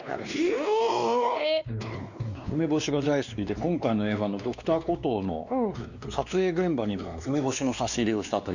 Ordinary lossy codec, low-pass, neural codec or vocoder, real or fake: none; 7.2 kHz; codec, 16 kHz, 2 kbps, X-Codec, WavLM features, trained on Multilingual LibriSpeech; fake